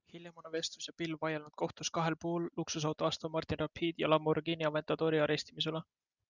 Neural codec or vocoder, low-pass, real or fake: none; 7.2 kHz; real